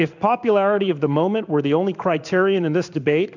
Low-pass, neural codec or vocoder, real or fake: 7.2 kHz; codec, 16 kHz in and 24 kHz out, 1 kbps, XY-Tokenizer; fake